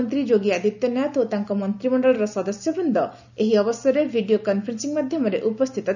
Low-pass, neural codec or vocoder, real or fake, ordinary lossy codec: 7.2 kHz; none; real; none